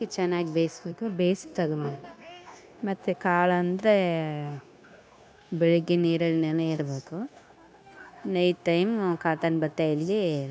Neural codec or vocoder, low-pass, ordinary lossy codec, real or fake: codec, 16 kHz, 0.9 kbps, LongCat-Audio-Codec; none; none; fake